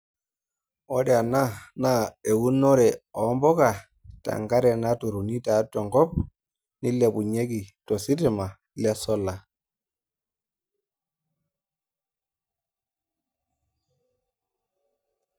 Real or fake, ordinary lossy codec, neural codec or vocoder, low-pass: real; none; none; none